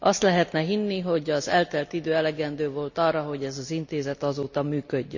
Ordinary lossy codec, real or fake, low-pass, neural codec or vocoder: none; real; 7.2 kHz; none